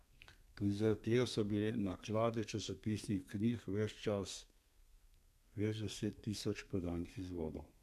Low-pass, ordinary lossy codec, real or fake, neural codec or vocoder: 14.4 kHz; none; fake; codec, 32 kHz, 1.9 kbps, SNAC